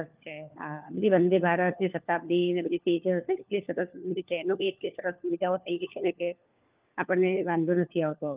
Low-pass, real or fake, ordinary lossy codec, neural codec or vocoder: 3.6 kHz; fake; Opus, 24 kbps; codec, 16 kHz, 4 kbps, FunCodec, trained on LibriTTS, 50 frames a second